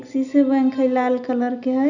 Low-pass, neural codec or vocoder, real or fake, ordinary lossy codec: 7.2 kHz; none; real; none